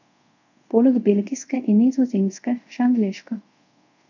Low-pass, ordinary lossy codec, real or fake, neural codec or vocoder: 7.2 kHz; none; fake; codec, 24 kHz, 0.5 kbps, DualCodec